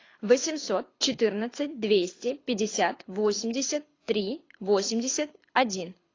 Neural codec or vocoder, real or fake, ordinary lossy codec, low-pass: codec, 24 kHz, 6 kbps, HILCodec; fake; AAC, 32 kbps; 7.2 kHz